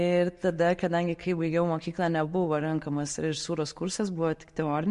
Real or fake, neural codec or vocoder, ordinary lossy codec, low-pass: real; none; MP3, 48 kbps; 14.4 kHz